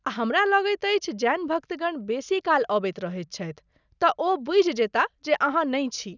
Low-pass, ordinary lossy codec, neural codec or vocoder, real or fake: 7.2 kHz; none; none; real